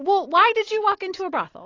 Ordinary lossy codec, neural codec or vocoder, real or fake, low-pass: AAC, 32 kbps; none; real; 7.2 kHz